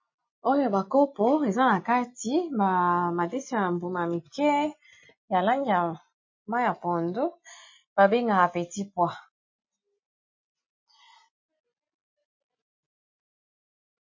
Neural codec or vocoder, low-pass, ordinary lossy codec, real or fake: none; 7.2 kHz; MP3, 32 kbps; real